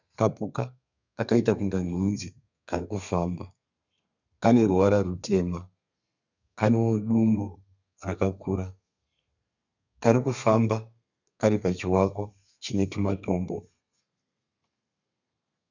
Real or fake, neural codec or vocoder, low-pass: fake; codec, 32 kHz, 1.9 kbps, SNAC; 7.2 kHz